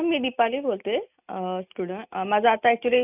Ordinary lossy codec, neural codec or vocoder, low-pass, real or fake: none; none; 3.6 kHz; real